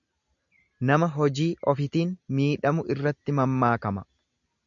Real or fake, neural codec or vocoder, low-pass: real; none; 7.2 kHz